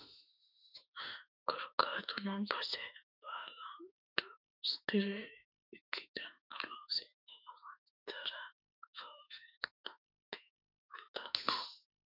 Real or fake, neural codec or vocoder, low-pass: fake; autoencoder, 48 kHz, 32 numbers a frame, DAC-VAE, trained on Japanese speech; 5.4 kHz